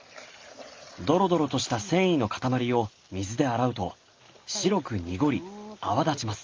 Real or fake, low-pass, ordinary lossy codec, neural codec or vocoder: real; 7.2 kHz; Opus, 32 kbps; none